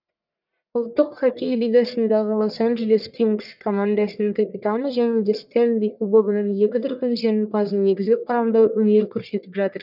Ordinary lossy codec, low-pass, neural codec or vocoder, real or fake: none; 5.4 kHz; codec, 44.1 kHz, 1.7 kbps, Pupu-Codec; fake